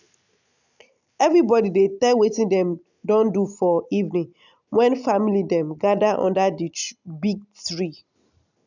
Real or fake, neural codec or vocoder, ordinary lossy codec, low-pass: real; none; none; 7.2 kHz